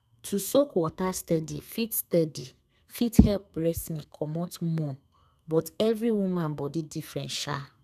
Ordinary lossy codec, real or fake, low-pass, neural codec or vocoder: none; fake; 14.4 kHz; codec, 32 kHz, 1.9 kbps, SNAC